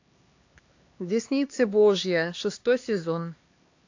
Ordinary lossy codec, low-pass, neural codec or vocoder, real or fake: AAC, 48 kbps; 7.2 kHz; codec, 16 kHz, 2 kbps, X-Codec, HuBERT features, trained on LibriSpeech; fake